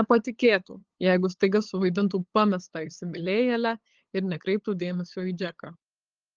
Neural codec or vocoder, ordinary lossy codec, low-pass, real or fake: codec, 16 kHz, 8 kbps, FunCodec, trained on LibriTTS, 25 frames a second; Opus, 16 kbps; 7.2 kHz; fake